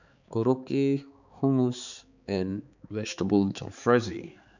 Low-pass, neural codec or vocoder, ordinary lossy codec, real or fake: 7.2 kHz; codec, 16 kHz, 4 kbps, X-Codec, HuBERT features, trained on balanced general audio; none; fake